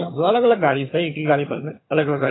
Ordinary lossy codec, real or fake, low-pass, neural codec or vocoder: AAC, 16 kbps; fake; 7.2 kHz; vocoder, 22.05 kHz, 80 mel bands, HiFi-GAN